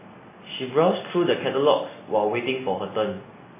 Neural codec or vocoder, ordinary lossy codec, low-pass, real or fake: none; MP3, 16 kbps; 3.6 kHz; real